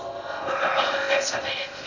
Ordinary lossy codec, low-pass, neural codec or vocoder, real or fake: none; 7.2 kHz; codec, 16 kHz in and 24 kHz out, 0.6 kbps, FocalCodec, streaming, 2048 codes; fake